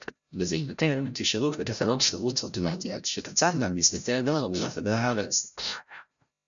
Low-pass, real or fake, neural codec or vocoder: 7.2 kHz; fake; codec, 16 kHz, 0.5 kbps, FreqCodec, larger model